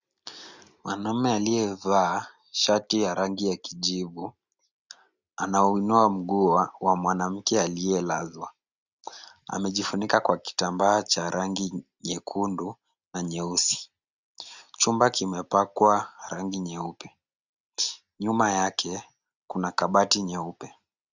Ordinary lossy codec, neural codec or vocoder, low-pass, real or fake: Opus, 64 kbps; none; 7.2 kHz; real